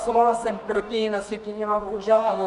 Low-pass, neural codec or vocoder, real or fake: 10.8 kHz; codec, 24 kHz, 0.9 kbps, WavTokenizer, medium music audio release; fake